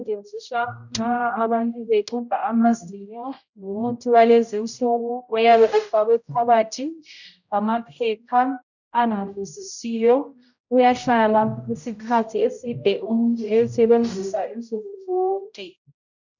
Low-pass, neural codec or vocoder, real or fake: 7.2 kHz; codec, 16 kHz, 0.5 kbps, X-Codec, HuBERT features, trained on general audio; fake